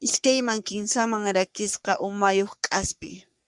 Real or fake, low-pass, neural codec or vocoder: fake; 10.8 kHz; codec, 44.1 kHz, 3.4 kbps, Pupu-Codec